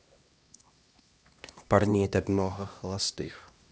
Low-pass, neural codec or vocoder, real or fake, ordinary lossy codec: none; codec, 16 kHz, 1 kbps, X-Codec, HuBERT features, trained on LibriSpeech; fake; none